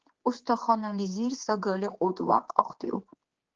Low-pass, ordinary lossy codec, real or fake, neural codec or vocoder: 7.2 kHz; Opus, 16 kbps; fake; codec, 16 kHz, 4 kbps, X-Codec, HuBERT features, trained on general audio